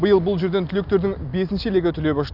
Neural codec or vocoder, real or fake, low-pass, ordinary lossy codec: none; real; 5.4 kHz; Opus, 64 kbps